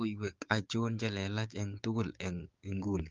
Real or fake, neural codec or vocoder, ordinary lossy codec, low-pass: fake; codec, 16 kHz, 6 kbps, DAC; Opus, 32 kbps; 7.2 kHz